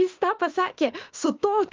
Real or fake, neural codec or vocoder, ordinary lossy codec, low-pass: fake; autoencoder, 48 kHz, 32 numbers a frame, DAC-VAE, trained on Japanese speech; Opus, 24 kbps; 7.2 kHz